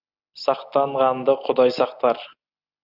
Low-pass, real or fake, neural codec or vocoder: 5.4 kHz; real; none